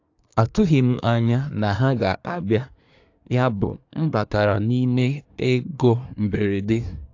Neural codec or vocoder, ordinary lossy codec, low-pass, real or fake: codec, 24 kHz, 1 kbps, SNAC; AAC, 48 kbps; 7.2 kHz; fake